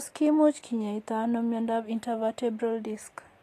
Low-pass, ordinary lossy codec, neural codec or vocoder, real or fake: 14.4 kHz; AAC, 48 kbps; none; real